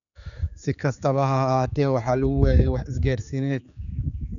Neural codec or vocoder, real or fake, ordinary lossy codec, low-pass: codec, 16 kHz, 4 kbps, X-Codec, HuBERT features, trained on general audio; fake; none; 7.2 kHz